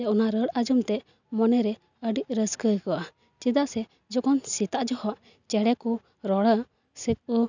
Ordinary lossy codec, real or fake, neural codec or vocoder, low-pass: none; real; none; 7.2 kHz